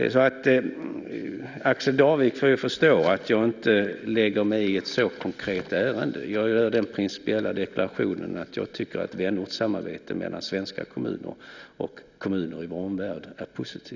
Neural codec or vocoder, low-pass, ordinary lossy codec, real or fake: none; 7.2 kHz; none; real